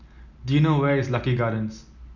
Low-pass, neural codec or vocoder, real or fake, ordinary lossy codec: 7.2 kHz; none; real; none